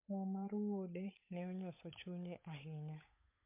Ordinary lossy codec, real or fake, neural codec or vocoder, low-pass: MP3, 24 kbps; fake; codec, 16 kHz, 8 kbps, FunCodec, trained on Chinese and English, 25 frames a second; 3.6 kHz